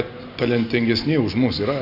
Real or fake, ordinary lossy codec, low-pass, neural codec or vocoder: real; AAC, 48 kbps; 5.4 kHz; none